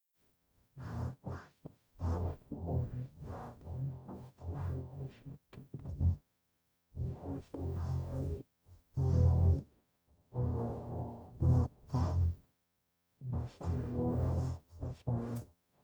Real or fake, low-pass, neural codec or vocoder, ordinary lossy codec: fake; none; codec, 44.1 kHz, 0.9 kbps, DAC; none